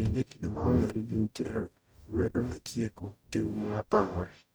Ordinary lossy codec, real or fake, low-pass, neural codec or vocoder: none; fake; none; codec, 44.1 kHz, 0.9 kbps, DAC